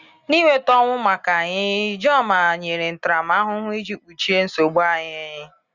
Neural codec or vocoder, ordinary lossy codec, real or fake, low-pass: none; Opus, 64 kbps; real; 7.2 kHz